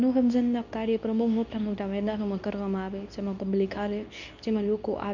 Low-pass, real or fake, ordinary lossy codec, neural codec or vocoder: 7.2 kHz; fake; none; codec, 24 kHz, 0.9 kbps, WavTokenizer, medium speech release version 2